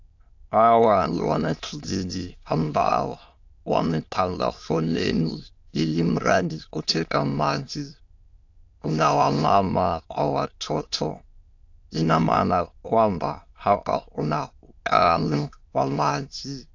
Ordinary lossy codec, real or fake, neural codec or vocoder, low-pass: AAC, 48 kbps; fake; autoencoder, 22.05 kHz, a latent of 192 numbers a frame, VITS, trained on many speakers; 7.2 kHz